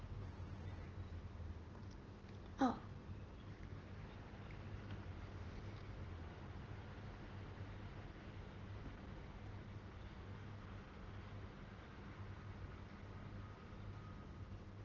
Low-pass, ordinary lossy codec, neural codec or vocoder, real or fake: 7.2 kHz; Opus, 16 kbps; none; real